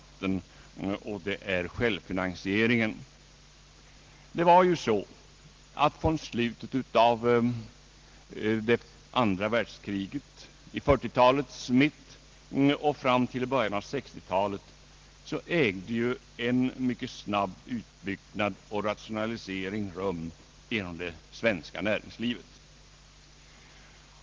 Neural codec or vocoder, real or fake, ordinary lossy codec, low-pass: none; real; Opus, 16 kbps; 7.2 kHz